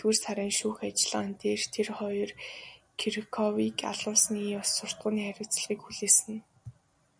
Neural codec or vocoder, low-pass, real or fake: none; 9.9 kHz; real